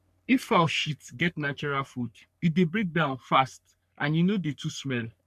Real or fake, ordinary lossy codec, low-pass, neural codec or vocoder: fake; none; 14.4 kHz; codec, 44.1 kHz, 3.4 kbps, Pupu-Codec